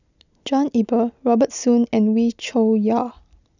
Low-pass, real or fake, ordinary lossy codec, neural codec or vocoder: 7.2 kHz; real; none; none